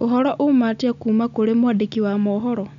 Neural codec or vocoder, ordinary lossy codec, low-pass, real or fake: none; none; 7.2 kHz; real